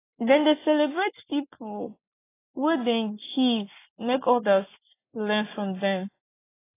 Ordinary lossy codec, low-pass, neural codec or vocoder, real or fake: AAC, 24 kbps; 3.6 kHz; none; real